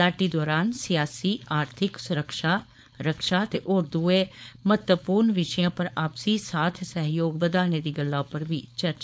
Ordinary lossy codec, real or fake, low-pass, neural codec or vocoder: none; fake; none; codec, 16 kHz, 4.8 kbps, FACodec